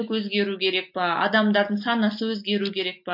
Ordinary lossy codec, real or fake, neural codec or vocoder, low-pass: MP3, 32 kbps; real; none; 5.4 kHz